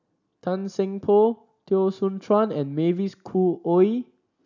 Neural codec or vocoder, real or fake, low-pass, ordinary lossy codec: none; real; 7.2 kHz; none